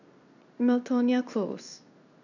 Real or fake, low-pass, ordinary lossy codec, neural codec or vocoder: fake; 7.2 kHz; none; codec, 16 kHz in and 24 kHz out, 1 kbps, XY-Tokenizer